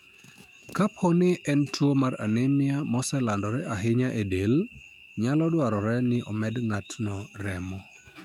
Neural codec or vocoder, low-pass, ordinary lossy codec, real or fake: autoencoder, 48 kHz, 128 numbers a frame, DAC-VAE, trained on Japanese speech; 19.8 kHz; none; fake